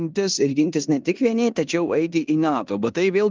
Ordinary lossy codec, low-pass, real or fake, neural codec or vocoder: Opus, 24 kbps; 7.2 kHz; fake; codec, 16 kHz in and 24 kHz out, 0.9 kbps, LongCat-Audio-Codec, four codebook decoder